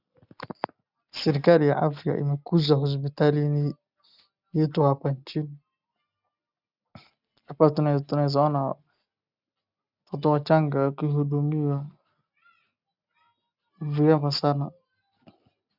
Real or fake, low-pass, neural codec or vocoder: real; 5.4 kHz; none